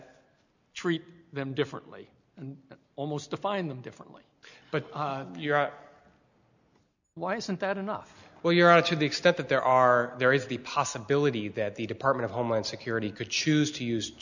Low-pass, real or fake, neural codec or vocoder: 7.2 kHz; real; none